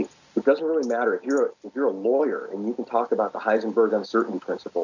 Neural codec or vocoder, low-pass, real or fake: none; 7.2 kHz; real